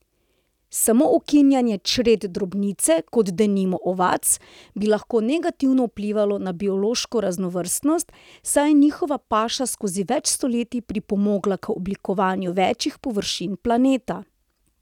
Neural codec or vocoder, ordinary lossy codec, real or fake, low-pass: none; none; real; 19.8 kHz